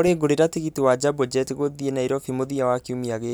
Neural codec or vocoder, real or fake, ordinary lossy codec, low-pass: none; real; none; none